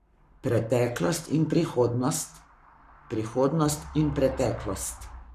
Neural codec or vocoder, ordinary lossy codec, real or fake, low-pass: codec, 44.1 kHz, 7.8 kbps, Pupu-Codec; none; fake; 14.4 kHz